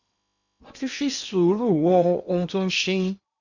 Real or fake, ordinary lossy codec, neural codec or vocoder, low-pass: fake; none; codec, 16 kHz in and 24 kHz out, 0.8 kbps, FocalCodec, streaming, 65536 codes; 7.2 kHz